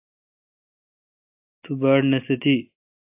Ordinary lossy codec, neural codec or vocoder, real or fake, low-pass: AAC, 24 kbps; none; real; 3.6 kHz